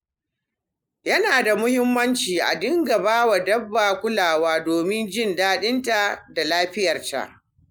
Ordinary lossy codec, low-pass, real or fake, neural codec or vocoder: none; none; real; none